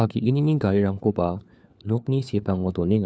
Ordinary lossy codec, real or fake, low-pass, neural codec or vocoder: none; fake; none; codec, 16 kHz, 4 kbps, FunCodec, trained on LibriTTS, 50 frames a second